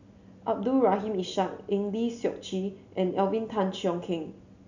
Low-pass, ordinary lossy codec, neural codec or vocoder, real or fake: 7.2 kHz; none; none; real